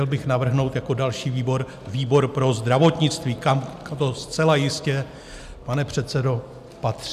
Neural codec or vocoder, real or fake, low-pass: none; real; 14.4 kHz